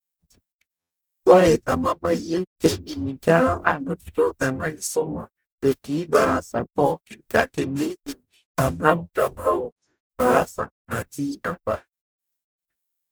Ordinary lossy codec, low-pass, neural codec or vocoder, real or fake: none; none; codec, 44.1 kHz, 0.9 kbps, DAC; fake